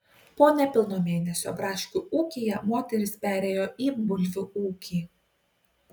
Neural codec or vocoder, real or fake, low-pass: none; real; 19.8 kHz